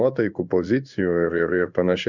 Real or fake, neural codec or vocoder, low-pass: fake; codec, 16 kHz in and 24 kHz out, 1 kbps, XY-Tokenizer; 7.2 kHz